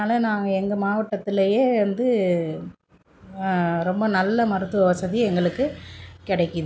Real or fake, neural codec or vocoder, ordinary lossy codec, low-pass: real; none; none; none